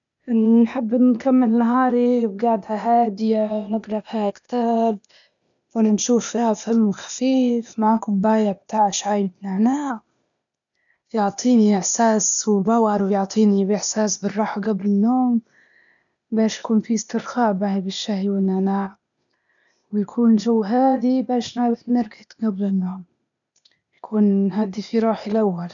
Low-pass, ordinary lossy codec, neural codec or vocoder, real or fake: 7.2 kHz; none; codec, 16 kHz, 0.8 kbps, ZipCodec; fake